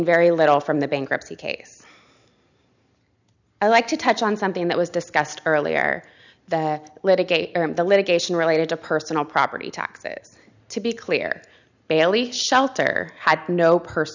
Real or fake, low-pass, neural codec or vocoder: real; 7.2 kHz; none